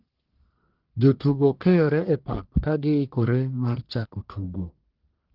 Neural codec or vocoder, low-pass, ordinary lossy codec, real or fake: codec, 44.1 kHz, 1.7 kbps, Pupu-Codec; 5.4 kHz; Opus, 16 kbps; fake